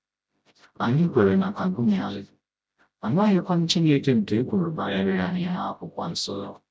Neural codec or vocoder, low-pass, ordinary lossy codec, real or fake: codec, 16 kHz, 0.5 kbps, FreqCodec, smaller model; none; none; fake